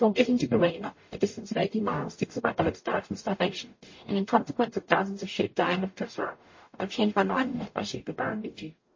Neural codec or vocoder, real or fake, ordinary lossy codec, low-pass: codec, 44.1 kHz, 0.9 kbps, DAC; fake; MP3, 32 kbps; 7.2 kHz